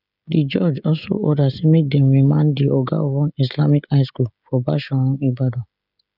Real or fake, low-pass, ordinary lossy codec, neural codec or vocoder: fake; 5.4 kHz; none; codec, 16 kHz, 16 kbps, FreqCodec, smaller model